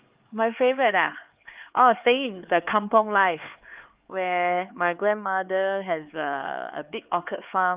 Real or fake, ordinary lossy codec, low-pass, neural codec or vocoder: fake; Opus, 32 kbps; 3.6 kHz; codec, 16 kHz, 4 kbps, X-Codec, HuBERT features, trained on LibriSpeech